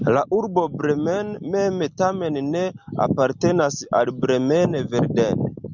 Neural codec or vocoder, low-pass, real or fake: none; 7.2 kHz; real